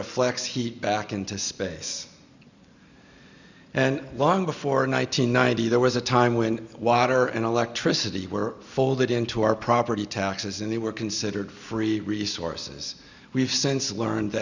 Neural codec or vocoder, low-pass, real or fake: none; 7.2 kHz; real